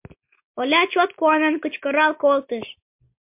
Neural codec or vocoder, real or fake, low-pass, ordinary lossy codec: none; real; 3.6 kHz; MP3, 32 kbps